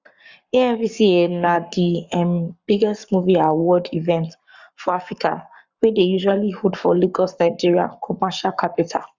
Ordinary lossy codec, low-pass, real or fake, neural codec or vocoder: Opus, 64 kbps; 7.2 kHz; fake; codec, 44.1 kHz, 7.8 kbps, Pupu-Codec